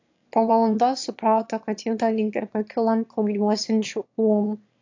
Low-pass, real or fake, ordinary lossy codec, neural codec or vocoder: 7.2 kHz; fake; AAC, 48 kbps; autoencoder, 22.05 kHz, a latent of 192 numbers a frame, VITS, trained on one speaker